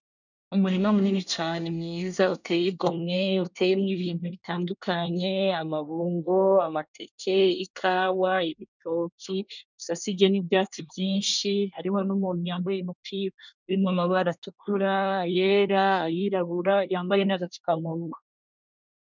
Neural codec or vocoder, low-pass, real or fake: codec, 24 kHz, 1 kbps, SNAC; 7.2 kHz; fake